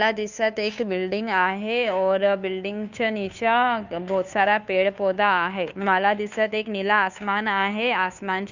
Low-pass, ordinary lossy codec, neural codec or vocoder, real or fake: 7.2 kHz; none; codec, 16 kHz, 2 kbps, FunCodec, trained on Chinese and English, 25 frames a second; fake